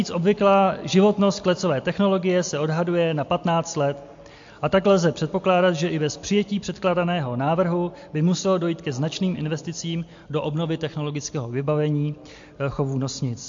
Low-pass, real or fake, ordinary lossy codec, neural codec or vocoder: 7.2 kHz; real; MP3, 48 kbps; none